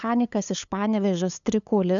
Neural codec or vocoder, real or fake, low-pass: codec, 16 kHz, 8 kbps, FunCodec, trained on LibriTTS, 25 frames a second; fake; 7.2 kHz